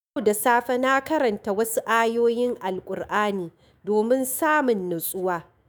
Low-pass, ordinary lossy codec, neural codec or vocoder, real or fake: none; none; autoencoder, 48 kHz, 128 numbers a frame, DAC-VAE, trained on Japanese speech; fake